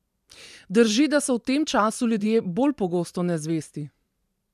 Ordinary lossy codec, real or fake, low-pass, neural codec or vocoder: none; fake; 14.4 kHz; vocoder, 44.1 kHz, 128 mel bands every 512 samples, BigVGAN v2